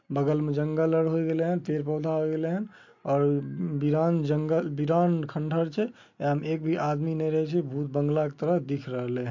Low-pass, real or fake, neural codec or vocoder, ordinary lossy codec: 7.2 kHz; real; none; MP3, 48 kbps